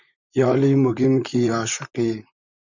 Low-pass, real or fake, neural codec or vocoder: 7.2 kHz; fake; vocoder, 44.1 kHz, 128 mel bands, Pupu-Vocoder